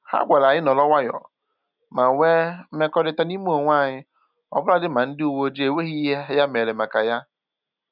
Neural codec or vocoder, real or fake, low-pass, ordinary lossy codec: none; real; 5.4 kHz; none